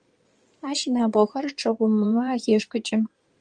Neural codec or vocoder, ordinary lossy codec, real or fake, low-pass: codec, 16 kHz in and 24 kHz out, 2.2 kbps, FireRedTTS-2 codec; Opus, 32 kbps; fake; 9.9 kHz